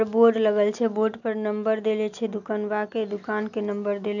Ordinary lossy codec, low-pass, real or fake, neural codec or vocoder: none; 7.2 kHz; real; none